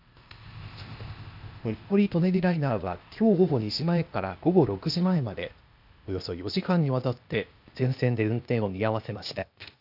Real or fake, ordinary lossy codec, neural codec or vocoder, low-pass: fake; none; codec, 16 kHz, 0.8 kbps, ZipCodec; 5.4 kHz